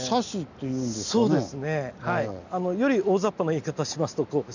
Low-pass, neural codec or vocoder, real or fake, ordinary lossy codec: 7.2 kHz; none; real; none